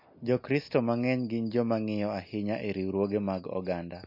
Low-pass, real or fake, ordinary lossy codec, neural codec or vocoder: 5.4 kHz; real; MP3, 32 kbps; none